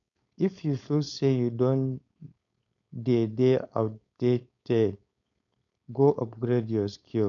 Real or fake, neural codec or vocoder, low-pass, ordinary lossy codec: fake; codec, 16 kHz, 4.8 kbps, FACodec; 7.2 kHz; none